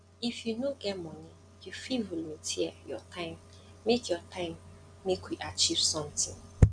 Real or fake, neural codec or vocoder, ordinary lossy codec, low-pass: real; none; MP3, 96 kbps; 9.9 kHz